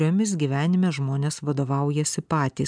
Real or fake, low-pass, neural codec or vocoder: real; 9.9 kHz; none